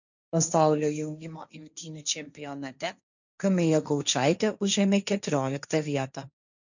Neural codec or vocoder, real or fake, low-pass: codec, 16 kHz, 1.1 kbps, Voila-Tokenizer; fake; 7.2 kHz